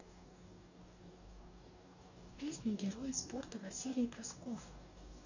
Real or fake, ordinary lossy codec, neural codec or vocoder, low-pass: fake; none; codec, 44.1 kHz, 2.6 kbps, DAC; 7.2 kHz